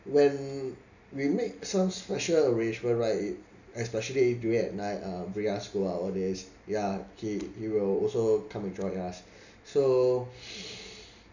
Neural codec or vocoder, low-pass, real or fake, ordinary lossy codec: none; 7.2 kHz; real; none